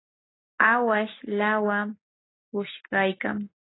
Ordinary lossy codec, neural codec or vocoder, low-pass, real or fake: AAC, 16 kbps; none; 7.2 kHz; real